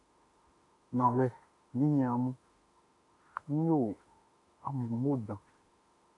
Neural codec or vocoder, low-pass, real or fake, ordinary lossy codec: autoencoder, 48 kHz, 32 numbers a frame, DAC-VAE, trained on Japanese speech; 10.8 kHz; fake; MP3, 64 kbps